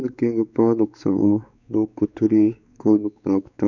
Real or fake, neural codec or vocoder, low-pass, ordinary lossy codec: fake; codec, 16 kHz, 4 kbps, X-Codec, WavLM features, trained on Multilingual LibriSpeech; 7.2 kHz; none